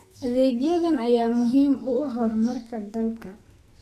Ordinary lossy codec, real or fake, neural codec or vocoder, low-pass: none; fake; codec, 44.1 kHz, 2.6 kbps, SNAC; 14.4 kHz